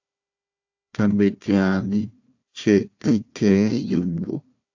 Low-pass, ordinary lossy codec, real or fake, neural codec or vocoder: 7.2 kHz; AAC, 48 kbps; fake; codec, 16 kHz, 1 kbps, FunCodec, trained on Chinese and English, 50 frames a second